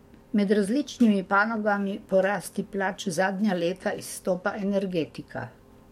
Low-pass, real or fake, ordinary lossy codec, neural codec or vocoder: 19.8 kHz; fake; MP3, 64 kbps; codec, 44.1 kHz, 7.8 kbps, DAC